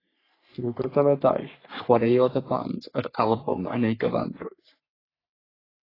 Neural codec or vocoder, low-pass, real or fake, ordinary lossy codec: codec, 44.1 kHz, 3.4 kbps, Pupu-Codec; 5.4 kHz; fake; AAC, 24 kbps